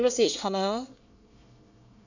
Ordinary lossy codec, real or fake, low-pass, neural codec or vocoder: none; fake; 7.2 kHz; codec, 24 kHz, 1 kbps, SNAC